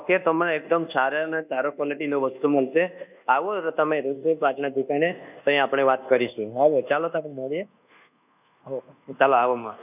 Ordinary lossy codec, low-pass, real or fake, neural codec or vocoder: none; 3.6 kHz; fake; codec, 24 kHz, 1.2 kbps, DualCodec